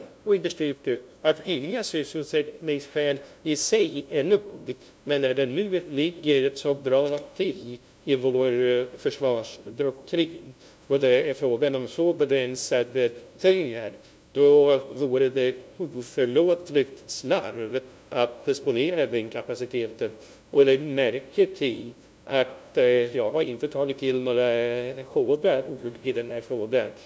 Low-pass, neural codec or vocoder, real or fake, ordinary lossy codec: none; codec, 16 kHz, 0.5 kbps, FunCodec, trained on LibriTTS, 25 frames a second; fake; none